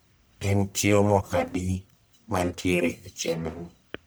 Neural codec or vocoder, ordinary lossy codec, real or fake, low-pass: codec, 44.1 kHz, 1.7 kbps, Pupu-Codec; none; fake; none